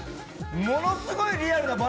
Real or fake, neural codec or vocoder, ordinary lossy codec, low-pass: real; none; none; none